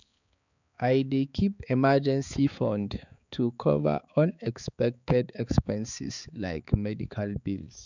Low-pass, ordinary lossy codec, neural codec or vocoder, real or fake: 7.2 kHz; none; codec, 16 kHz, 4 kbps, X-Codec, HuBERT features, trained on balanced general audio; fake